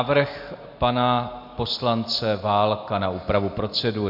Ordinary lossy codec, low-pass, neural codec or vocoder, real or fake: MP3, 48 kbps; 5.4 kHz; none; real